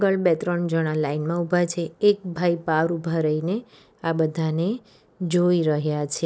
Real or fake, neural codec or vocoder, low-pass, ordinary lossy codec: real; none; none; none